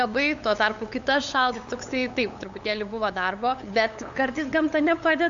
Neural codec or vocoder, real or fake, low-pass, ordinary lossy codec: codec, 16 kHz, 8 kbps, FunCodec, trained on LibriTTS, 25 frames a second; fake; 7.2 kHz; AAC, 64 kbps